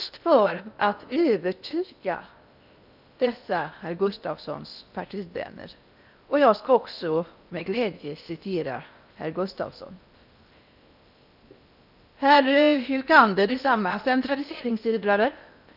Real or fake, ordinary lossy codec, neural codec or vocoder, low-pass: fake; none; codec, 16 kHz in and 24 kHz out, 0.6 kbps, FocalCodec, streaming, 4096 codes; 5.4 kHz